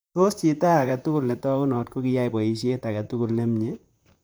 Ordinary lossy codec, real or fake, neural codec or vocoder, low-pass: none; fake; vocoder, 44.1 kHz, 128 mel bands, Pupu-Vocoder; none